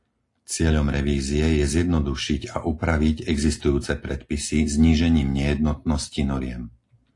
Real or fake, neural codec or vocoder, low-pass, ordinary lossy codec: real; none; 10.8 kHz; AAC, 48 kbps